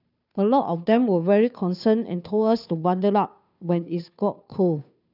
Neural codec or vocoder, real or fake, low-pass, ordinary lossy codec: codec, 16 kHz, 2 kbps, FunCodec, trained on Chinese and English, 25 frames a second; fake; 5.4 kHz; none